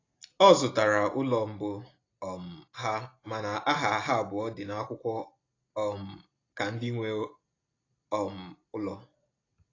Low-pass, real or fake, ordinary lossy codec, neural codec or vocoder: 7.2 kHz; real; AAC, 32 kbps; none